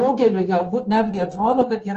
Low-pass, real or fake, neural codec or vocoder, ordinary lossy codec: 7.2 kHz; fake; codec, 16 kHz, 0.9 kbps, LongCat-Audio-Codec; Opus, 16 kbps